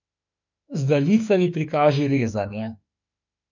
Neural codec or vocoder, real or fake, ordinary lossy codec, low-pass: autoencoder, 48 kHz, 32 numbers a frame, DAC-VAE, trained on Japanese speech; fake; none; 7.2 kHz